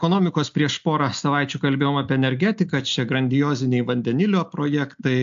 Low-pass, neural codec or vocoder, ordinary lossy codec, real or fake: 7.2 kHz; none; AAC, 64 kbps; real